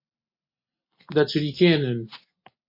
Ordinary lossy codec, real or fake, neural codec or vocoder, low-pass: MP3, 32 kbps; real; none; 5.4 kHz